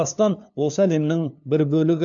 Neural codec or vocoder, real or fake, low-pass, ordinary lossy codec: codec, 16 kHz, 2 kbps, FunCodec, trained on LibriTTS, 25 frames a second; fake; 7.2 kHz; none